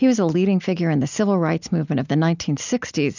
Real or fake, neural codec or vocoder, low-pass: real; none; 7.2 kHz